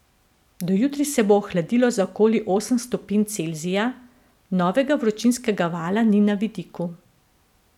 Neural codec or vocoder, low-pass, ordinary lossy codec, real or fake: none; 19.8 kHz; none; real